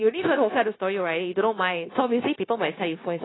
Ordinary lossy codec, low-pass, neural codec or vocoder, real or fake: AAC, 16 kbps; 7.2 kHz; codec, 16 kHz, 0.9 kbps, LongCat-Audio-Codec; fake